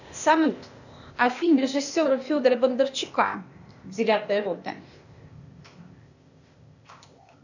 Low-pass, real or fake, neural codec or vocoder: 7.2 kHz; fake; codec, 16 kHz, 0.8 kbps, ZipCodec